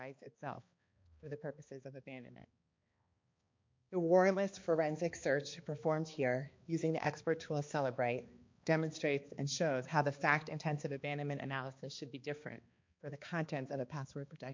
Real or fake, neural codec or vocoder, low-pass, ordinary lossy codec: fake; codec, 16 kHz, 2 kbps, X-Codec, HuBERT features, trained on balanced general audio; 7.2 kHz; MP3, 48 kbps